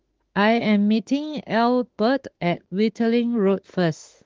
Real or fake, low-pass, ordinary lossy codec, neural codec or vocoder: fake; 7.2 kHz; Opus, 16 kbps; autoencoder, 48 kHz, 32 numbers a frame, DAC-VAE, trained on Japanese speech